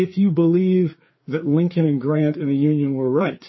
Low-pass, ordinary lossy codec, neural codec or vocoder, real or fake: 7.2 kHz; MP3, 24 kbps; codec, 16 kHz, 4 kbps, FreqCodec, larger model; fake